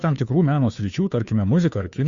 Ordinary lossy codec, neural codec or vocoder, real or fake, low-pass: Opus, 64 kbps; codec, 16 kHz, 2 kbps, FunCodec, trained on Chinese and English, 25 frames a second; fake; 7.2 kHz